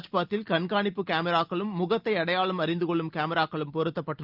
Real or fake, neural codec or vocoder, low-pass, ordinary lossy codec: real; none; 5.4 kHz; Opus, 24 kbps